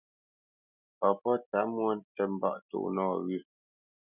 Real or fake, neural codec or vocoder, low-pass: real; none; 3.6 kHz